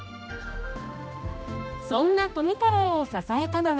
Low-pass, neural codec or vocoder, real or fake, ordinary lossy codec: none; codec, 16 kHz, 1 kbps, X-Codec, HuBERT features, trained on general audio; fake; none